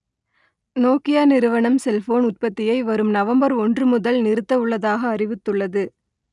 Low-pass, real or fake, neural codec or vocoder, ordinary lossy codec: 10.8 kHz; real; none; none